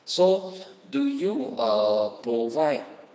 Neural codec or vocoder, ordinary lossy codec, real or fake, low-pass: codec, 16 kHz, 2 kbps, FreqCodec, smaller model; none; fake; none